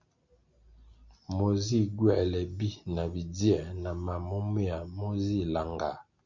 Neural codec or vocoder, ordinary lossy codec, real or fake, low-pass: none; Opus, 64 kbps; real; 7.2 kHz